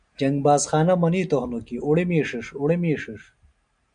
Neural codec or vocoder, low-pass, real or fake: none; 9.9 kHz; real